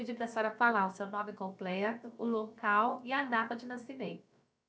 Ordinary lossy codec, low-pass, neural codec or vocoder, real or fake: none; none; codec, 16 kHz, about 1 kbps, DyCAST, with the encoder's durations; fake